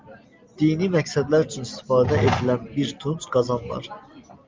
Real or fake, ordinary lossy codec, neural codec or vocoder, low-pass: real; Opus, 24 kbps; none; 7.2 kHz